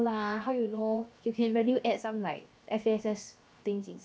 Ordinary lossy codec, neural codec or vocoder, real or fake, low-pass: none; codec, 16 kHz, 0.7 kbps, FocalCodec; fake; none